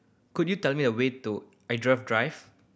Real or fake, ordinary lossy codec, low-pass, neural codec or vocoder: real; none; none; none